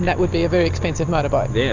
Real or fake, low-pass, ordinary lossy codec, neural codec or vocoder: real; 7.2 kHz; Opus, 64 kbps; none